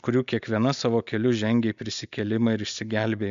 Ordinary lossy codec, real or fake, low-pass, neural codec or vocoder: MP3, 64 kbps; real; 7.2 kHz; none